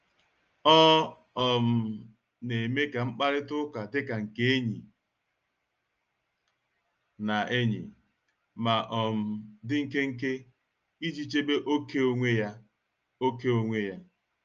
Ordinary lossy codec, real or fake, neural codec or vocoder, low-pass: Opus, 24 kbps; real; none; 7.2 kHz